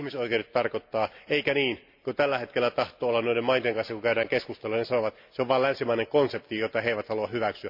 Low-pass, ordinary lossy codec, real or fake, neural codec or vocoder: 5.4 kHz; MP3, 48 kbps; real; none